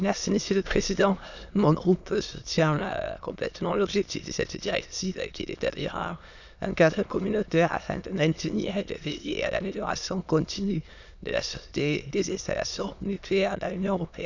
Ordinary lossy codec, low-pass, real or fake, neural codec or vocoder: none; 7.2 kHz; fake; autoencoder, 22.05 kHz, a latent of 192 numbers a frame, VITS, trained on many speakers